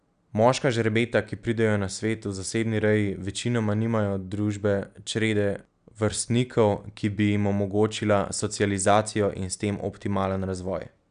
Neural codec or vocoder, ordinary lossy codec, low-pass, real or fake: none; none; 9.9 kHz; real